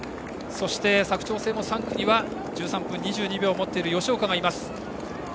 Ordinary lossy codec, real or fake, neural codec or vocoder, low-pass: none; real; none; none